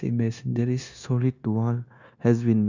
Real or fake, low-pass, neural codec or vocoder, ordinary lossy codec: fake; 7.2 kHz; codec, 16 kHz, 0.9 kbps, LongCat-Audio-Codec; Opus, 64 kbps